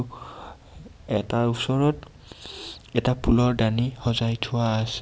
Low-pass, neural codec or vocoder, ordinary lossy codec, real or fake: none; none; none; real